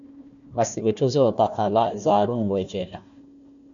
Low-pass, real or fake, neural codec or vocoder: 7.2 kHz; fake; codec, 16 kHz, 1 kbps, FunCodec, trained on Chinese and English, 50 frames a second